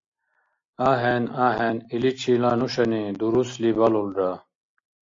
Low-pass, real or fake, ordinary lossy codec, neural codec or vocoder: 7.2 kHz; real; AAC, 48 kbps; none